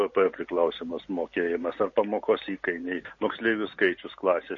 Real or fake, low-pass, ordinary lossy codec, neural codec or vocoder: real; 7.2 kHz; MP3, 32 kbps; none